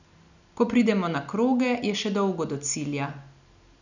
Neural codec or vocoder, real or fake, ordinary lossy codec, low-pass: none; real; none; 7.2 kHz